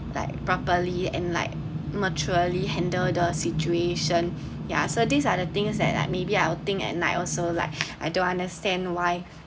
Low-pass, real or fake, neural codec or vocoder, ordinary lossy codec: none; real; none; none